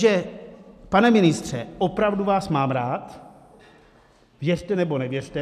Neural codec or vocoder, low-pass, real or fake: none; 14.4 kHz; real